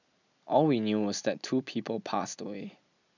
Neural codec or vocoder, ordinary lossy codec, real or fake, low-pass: none; none; real; 7.2 kHz